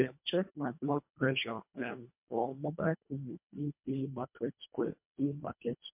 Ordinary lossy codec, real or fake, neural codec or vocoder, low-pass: MP3, 32 kbps; fake; codec, 24 kHz, 1.5 kbps, HILCodec; 3.6 kHz